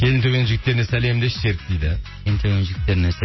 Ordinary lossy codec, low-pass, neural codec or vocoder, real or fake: MP3, 24 kbps; 7.2 kHz; none; real